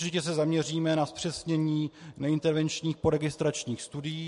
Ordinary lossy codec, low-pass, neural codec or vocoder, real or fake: MP3, 48 kbps; 14.4 kHz; none; real